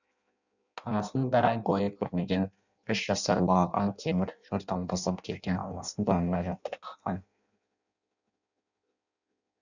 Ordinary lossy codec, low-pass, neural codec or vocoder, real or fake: none; 7.2 kHz; codec, 16 kHz in and 24 kHz out, 0.6 kbps, FireRedTTS-2 codec; fake